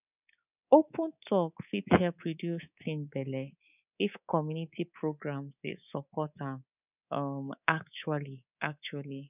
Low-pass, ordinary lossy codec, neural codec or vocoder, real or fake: 3.6 kHz; none; codec, 24 kHz, 3.1 kbps, DualCodec; fake